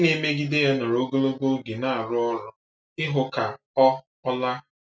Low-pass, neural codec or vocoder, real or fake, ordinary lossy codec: none; none; real; none